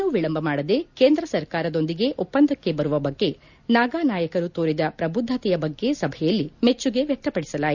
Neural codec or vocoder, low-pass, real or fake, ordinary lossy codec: none; 7.2 kHz; real; none